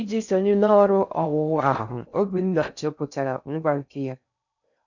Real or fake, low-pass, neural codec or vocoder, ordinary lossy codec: fake; 7.2 kHz; codec, 16 kHz in and 24 kHz out, 0.6 kbps, FocalCodec, streaming, 4096 codes; none